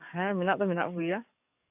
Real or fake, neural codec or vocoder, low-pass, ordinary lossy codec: fake; codec, 44.1 kHz, 7.8 kbps, DAC; 3.6 kHz; AAC, 32 kbps